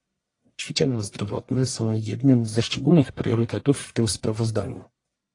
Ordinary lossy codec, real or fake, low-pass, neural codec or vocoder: AAC, 48 kbps; fake; 10.8 kHz; codec, 44.1 kHz, 1.7 kbps, Pupu-Codec